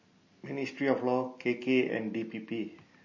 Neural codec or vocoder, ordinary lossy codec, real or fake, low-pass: none; MP3, 32 kbps; real; 7.2 kHz